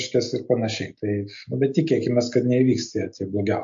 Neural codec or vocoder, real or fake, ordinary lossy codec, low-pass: none; real; MP3, 48 kbps; 7.2 kHz